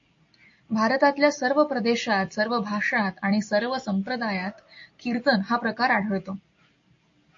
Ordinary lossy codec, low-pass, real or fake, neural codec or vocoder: AAC, 48 kbps; 7.2 kHz; real; none